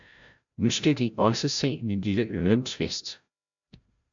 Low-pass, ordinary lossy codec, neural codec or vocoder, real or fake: 7.2 kHz; MP3, 64 kbps; codec, 16 kHz, 0.5 kbps, FreqCodec, larger model; fake